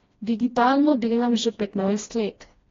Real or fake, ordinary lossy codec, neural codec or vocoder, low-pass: fake; AAC, 32 kbps; codec, 16 kHz, 1 kbps, FreqCodec, smaller model; 7.2 kHz